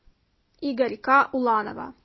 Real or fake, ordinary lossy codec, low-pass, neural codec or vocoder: real; MP3, 24 kbps; 7.2 kHz; none